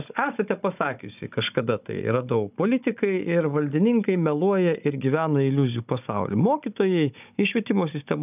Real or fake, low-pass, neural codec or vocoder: fake; 3.6 kHz; codec, 16 kHz, 16 kbps, FunCodec, trained on Chinese and English, 50 frames a second